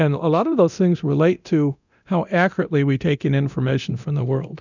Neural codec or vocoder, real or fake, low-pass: codec, 24 kHz, 0.9 kbps, DualCodec; fake; 7.2 kHz